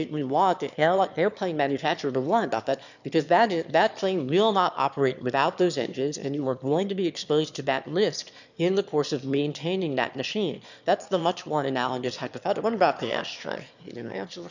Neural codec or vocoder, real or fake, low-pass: autoencoder, 22.05 kHz, a latent of 192 numbers a frame, VITS, trained on one speaker; fake; 7.2 kHz